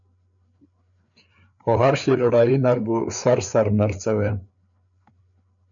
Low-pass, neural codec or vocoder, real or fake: 7.2 kHz; codec, 16 kHz, 4 kbps, FreqCodec, larger model; fake